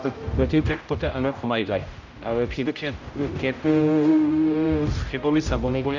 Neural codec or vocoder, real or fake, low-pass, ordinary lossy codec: codec, 16 kHz, 0.5 kbps, X-Codec, HuBERT features, trained on general audio; fake; 7.2 kHz; none